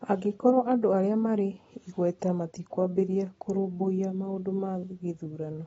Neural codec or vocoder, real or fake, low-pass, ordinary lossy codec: none; real; 19.8 kHz; AAC, 24 kbps